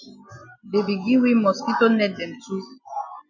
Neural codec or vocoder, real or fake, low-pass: none; real; 7.2 kHz